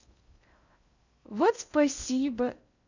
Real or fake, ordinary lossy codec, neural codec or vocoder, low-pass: fake; none; codec, 16 kHz in and 24 kHz out, 0.6 kbps, FocalCodec, streaming, 2048 codes; 7.2 kHz